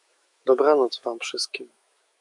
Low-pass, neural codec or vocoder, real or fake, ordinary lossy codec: 10.8 kHz; autoencoder, 48 kHz, 128 numbers a frame, DAC-VAE, trained on Japanese speech; fake; MP3, 64 kbps